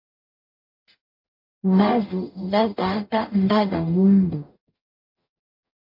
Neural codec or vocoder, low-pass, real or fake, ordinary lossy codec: codec, 44.1 kHz, 0.9 kbps, DAC; 5.4 kHz; fake; MP3, 32 kbps